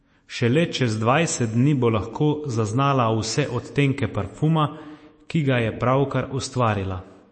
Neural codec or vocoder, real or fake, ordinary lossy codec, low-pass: autoencoder, 48 kHz, 128 numbers a frame, DAC-VAE, trained on Japanese speech; fake; MP3, 32 kbps; 9.9 kHz